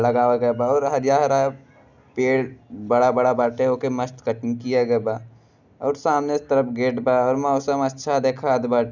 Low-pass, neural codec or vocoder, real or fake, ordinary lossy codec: 7.2 kHz; none; real; none